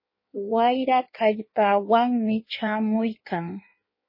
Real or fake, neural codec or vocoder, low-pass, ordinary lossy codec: fake; codec, 16 kHz in and 24 kHz out, 1.1 kbps, FireRedTTS-2 codec; 5.4 kHz; MP3, 24 kbps